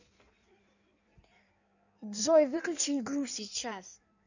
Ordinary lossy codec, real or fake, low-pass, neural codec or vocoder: none; fake; 7.2 kHz; codec, 16 kHz in and 24 kHz out, 1.1 kbps, FireRedTTS-2 codec